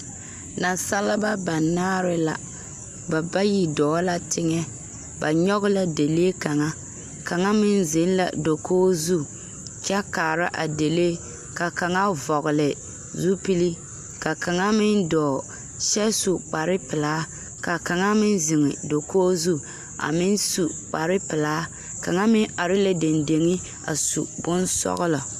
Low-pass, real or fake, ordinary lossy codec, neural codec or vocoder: 14.4 kHz; real; MP3, 96 kbps; none